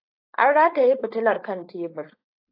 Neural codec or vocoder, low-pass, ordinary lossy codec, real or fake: codec, 16 kHz, 4.8 kbps, FACodec; 5.4 kHz; AAC, 32 kbps; fake